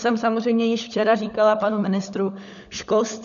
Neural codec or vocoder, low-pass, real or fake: codec, 16 kHz, 16 kbps, FunCodec, trained on LibriTTS, 50 frames a second; 7.2 kHz; fake